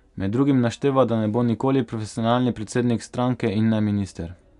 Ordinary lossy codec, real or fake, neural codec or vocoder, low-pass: none; real; none; 10.8 kHz